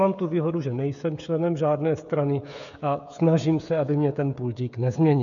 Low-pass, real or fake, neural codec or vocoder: 7.2 kHz; fake; codec, 16 kHz, 16 kbps, FreqCodec, smaller model